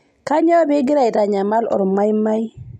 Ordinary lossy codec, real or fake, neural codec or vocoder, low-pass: MP3, 64 kbps; real; none; 19.8 kHz